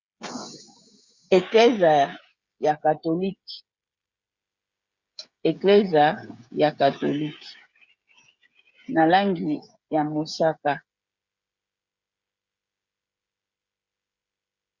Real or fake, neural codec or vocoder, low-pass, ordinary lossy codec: fake; codec, 16 kHz, 16 kbps, FreqCodec, smaller model; 7.2 kHz; Opus, 64 kbps